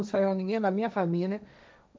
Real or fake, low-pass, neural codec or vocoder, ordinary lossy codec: fake; none; codec, 16 kHz, 1.1 kbps, Voila-Tokenizer; none